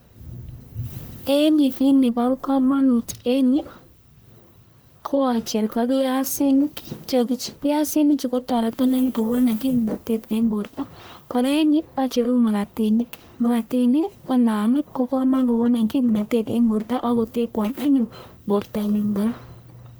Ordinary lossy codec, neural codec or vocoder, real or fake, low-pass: none; codec, 44.1 kHz, 1.7 kbps, Pupu-Codec; fake; none